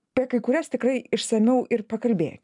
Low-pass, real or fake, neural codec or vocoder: 10.8 kHz; real; none